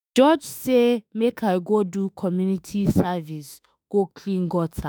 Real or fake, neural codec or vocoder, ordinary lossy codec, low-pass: fake; autoencoder, 48 kHz, 32 numbers a frame, DAC-VAE, trained on Japanese speech; none; none